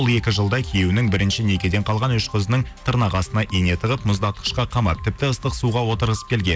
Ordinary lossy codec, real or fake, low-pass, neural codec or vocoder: none; real; none; none